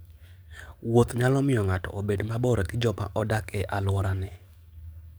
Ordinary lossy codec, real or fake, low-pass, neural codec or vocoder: none; fake; none; codec, 44.1 kHz, 7.8 kbps, DAC